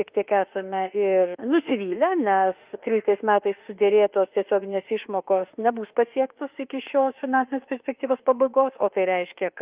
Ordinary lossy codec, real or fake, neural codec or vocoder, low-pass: Opus, 24 kbps; fake; autoencoder, 48 kHz, 32 numbers a frame, DAC-VAE, trained on Japanese speech; 3.6 kHz